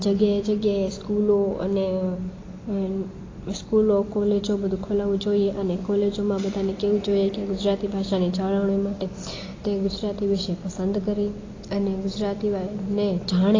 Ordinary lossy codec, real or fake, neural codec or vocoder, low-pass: AAC, 32 kbps; real; none; 7.2 kHz